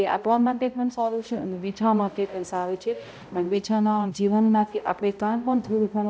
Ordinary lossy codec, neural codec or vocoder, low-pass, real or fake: none; codec, 16 kHz, 0.5 kbps, X-Codec, HuBERT features, trained on balanced general audio; none; fake